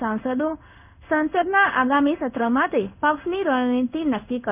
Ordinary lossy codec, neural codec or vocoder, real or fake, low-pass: none; codec, 16 kHz in and 24 kHz out, 1 kbps, XY-Tokenizer; fake; 3.6 kHz